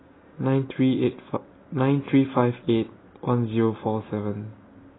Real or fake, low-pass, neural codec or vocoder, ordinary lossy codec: real; 7.2 kHz; none; AAC, 16 kbps